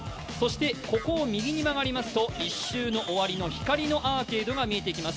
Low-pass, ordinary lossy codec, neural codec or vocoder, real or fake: none; none; none; real